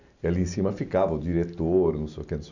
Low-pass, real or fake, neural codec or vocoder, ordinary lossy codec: 7.2 kHz; real; none; none